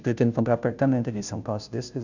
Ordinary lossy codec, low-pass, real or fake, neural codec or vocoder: none; 7.2 kHz; fake; codec, 16 kHz, 0.5 kbps, FunCodec, trained on Chinese and English, 25 frames a second